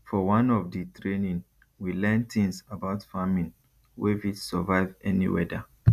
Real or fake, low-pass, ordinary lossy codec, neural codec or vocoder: real; 14.4 kHz; none; none